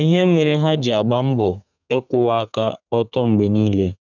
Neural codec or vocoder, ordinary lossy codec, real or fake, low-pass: codec, 32 kHz, 1.9 kbps, SNAC; none; fake; 7.2 kHz